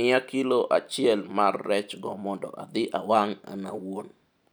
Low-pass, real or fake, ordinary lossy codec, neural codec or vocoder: none; real; none; none